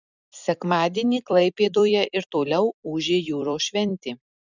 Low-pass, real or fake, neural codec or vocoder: 7.2 kHz; fake; vocoder, 44.1 kHz, 128 mel bands every 256 samples, BigVGAN v2